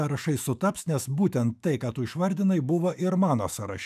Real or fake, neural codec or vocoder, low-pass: fake; autoencoder, 48 kHz, 128 numbers a frame, DAC-VAE, trained on Japanese speech; 14.4 kHz